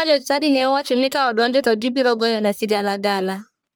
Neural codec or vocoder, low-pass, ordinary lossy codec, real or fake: codec, 44.1 kHz, 1.7 kbps, Pupu-Codec; none; none; fake